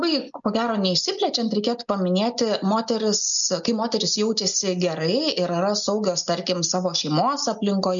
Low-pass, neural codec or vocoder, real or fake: 7.2 kHz; none; real